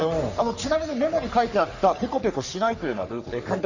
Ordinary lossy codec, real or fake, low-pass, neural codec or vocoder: none; fake; 7.2 kHz; codec, 44.1 kHz, 3.4 kbps, Pupu-Codec